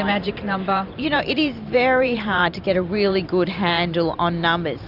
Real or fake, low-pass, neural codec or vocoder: fake; 5.4 kHz; vocoder, 44.1 kHz, 128 mel bands every 512 samples, BigVGAN v2